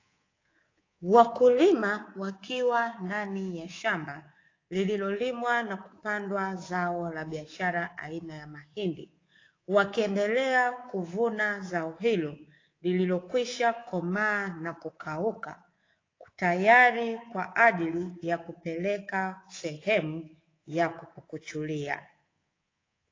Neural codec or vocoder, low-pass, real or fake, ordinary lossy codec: codec, 24 kHz, 3.1 kbps, DualCodec; 7.2 kHz; fake; AAC, 32 kbps